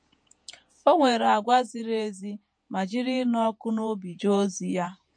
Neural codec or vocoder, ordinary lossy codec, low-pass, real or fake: vocoder, 48 kHz, 128 mel bands, Vocos; MP3, 48 kbps; 9.9 kHz; fake